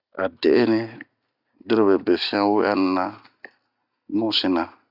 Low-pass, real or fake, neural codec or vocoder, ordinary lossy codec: 5.4 kHz; real; none; Opus, 64 kbps